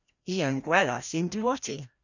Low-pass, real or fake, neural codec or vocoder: 7.2 kHz; fake; codec, 16 kHz, 1 kbps, FreqCodec, larger model